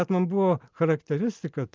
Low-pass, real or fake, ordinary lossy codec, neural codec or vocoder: 7.2 kHz; real; Opus, 24 kbps; none